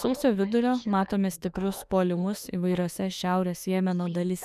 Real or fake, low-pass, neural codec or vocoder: fake; 14.4 kHz; autoencoder, 48 kHz, 32 numbers a frame, DAC-VAE, trained on Japanese speech